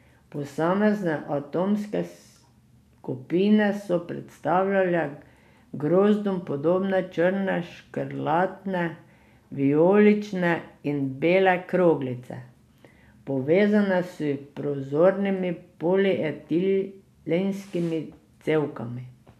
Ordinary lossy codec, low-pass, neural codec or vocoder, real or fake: none; 14.4 kHz; none; real